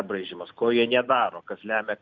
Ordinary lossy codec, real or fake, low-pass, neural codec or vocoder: Opus, 64 kbps; real; 7.2 kHz; none